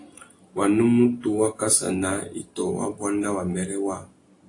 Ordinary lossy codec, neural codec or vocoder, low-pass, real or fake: AAC, 48 kbps; none; 10.8 kHz; real